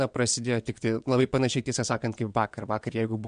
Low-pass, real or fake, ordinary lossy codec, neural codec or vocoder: 9.9 kHz; fake; MP3, 64 kbps; vocoder, 22.05 kHz, 80 mel bands, WaveNeXt